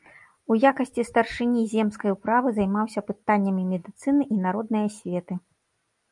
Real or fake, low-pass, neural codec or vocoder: real; 10.8 kHz; none